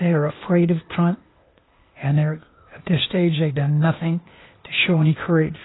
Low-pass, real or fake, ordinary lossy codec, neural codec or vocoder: 7.2 kHz; fake; AAC, 16 kbps; codec, 16 kHz, 0.8 kbps, ZipCodec